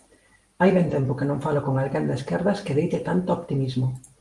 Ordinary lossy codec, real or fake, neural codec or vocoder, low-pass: Opus, 24 kbps; real; none; 10.8 kHz